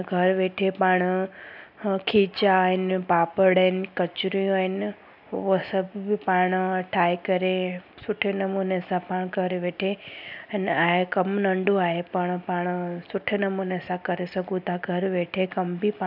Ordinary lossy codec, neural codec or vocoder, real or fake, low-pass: none; none; real; 5.4 kHz